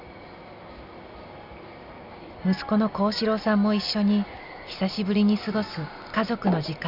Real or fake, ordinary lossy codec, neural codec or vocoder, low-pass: real; Opus, 64 kbps; none; 5.4 kHz